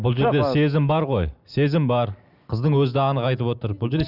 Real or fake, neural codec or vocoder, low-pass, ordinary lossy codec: real; none; 5.4 kHz; none